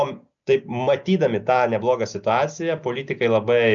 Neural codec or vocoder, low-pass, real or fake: none; 7.2 kHz; real